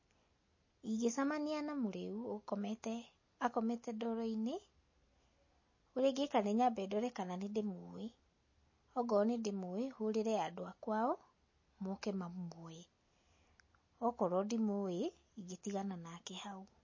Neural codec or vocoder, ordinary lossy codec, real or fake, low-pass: none; MP3, 32 kbps; real; 7.2 kHz